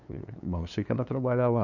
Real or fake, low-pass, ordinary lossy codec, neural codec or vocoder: fake; 7.2 kHz; none; codec, 16 kHz, 2 kbps, FunCodec, trained on LibriTTS, 25 frames a second